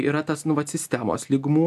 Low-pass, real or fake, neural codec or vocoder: 14.4 kHz; real; none